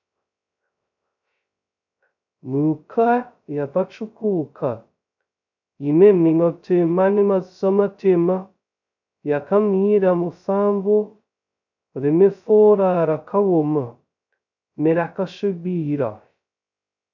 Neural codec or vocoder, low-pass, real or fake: codec, 16 kHz, 0.2 kbps, FocalCodec; 7.2 kHz; fake